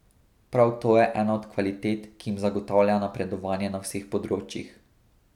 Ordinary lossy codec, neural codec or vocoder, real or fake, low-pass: none; none; real; 19.8 kHz